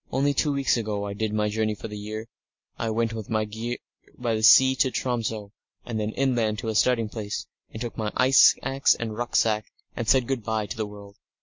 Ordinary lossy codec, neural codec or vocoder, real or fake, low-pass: MP3, 48 kbps; none; real; 7.2 kHz